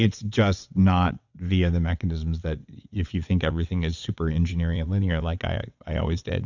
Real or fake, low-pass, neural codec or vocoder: fake; 7.2 kHz; vocoder, 22.05 kHz, 80 mel bands, WaveNeXt